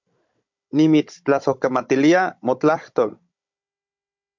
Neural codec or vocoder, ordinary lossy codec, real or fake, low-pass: codec, 16 kHz, 16 kbps, FunCodec, trained on Chinese and English, 50 frames a second; MP3, 64 kbps; fake; 7.2 kHz